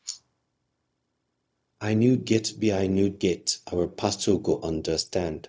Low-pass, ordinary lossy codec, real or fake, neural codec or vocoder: none; none; fake; codec, 16 kHz, 0.4 kbps, LongCat-Audio-Codec